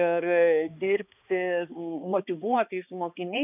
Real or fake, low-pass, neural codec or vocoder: fake; 3.6 kHz; codec, 16 kHz, 2 kbps, X-Codec, HuBERT features, trained on balanced general audio